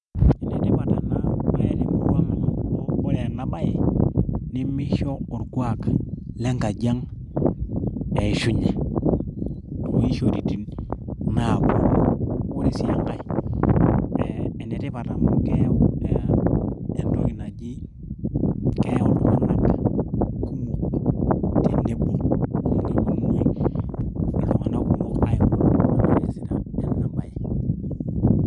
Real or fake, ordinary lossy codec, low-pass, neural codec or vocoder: real; none; 10.8 kHz; none